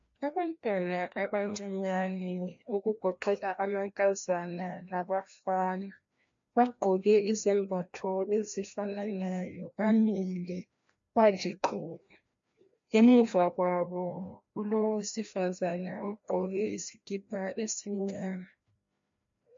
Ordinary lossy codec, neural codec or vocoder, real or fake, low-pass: MP3, 48 kbps; codec, 16 kHz, 1 kbps, FreqCodec, larger model; fake; 7.2 kHz